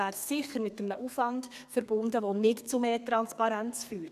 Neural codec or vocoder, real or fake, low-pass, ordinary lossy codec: codec, 32 kHz, 1.9 kbps, SNAC; fake; 14.4 kHz; none